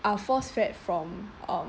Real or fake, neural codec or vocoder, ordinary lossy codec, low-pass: real; none; none; none